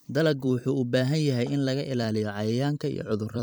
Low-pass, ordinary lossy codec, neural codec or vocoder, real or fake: none; none; vocoder, 44.1 kHz, 128 mel bands every 256 samples, BigVGAN v2; fake